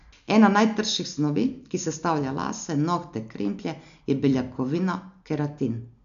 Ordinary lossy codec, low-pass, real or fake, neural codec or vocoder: none; 7.2 kHz; real; none